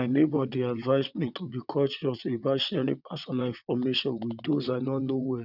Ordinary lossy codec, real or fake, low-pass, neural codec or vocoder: none; fake; 5.4 kHz; vocoder, 22.05 kHz, 80 mel bands, WaveNeXt